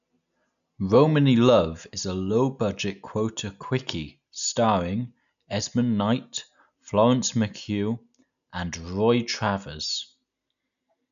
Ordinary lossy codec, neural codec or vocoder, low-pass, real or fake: MP3, 96 kbps; none; 7.2 kHz; real